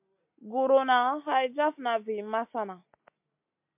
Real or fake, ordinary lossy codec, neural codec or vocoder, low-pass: real; MP3, 32 kbps; none; 3.6 kHz